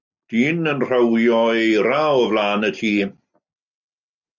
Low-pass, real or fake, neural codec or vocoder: 7.2 kHz; real; none